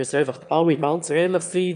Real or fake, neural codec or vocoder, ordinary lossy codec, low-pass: fake; autoencoder, 22.05 kHz, a latent of 192 numbers a frame, VITS, trained on one speaker; none; 9.9 kHz